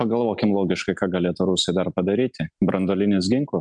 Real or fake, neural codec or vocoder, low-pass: real; none; 9.9 kHz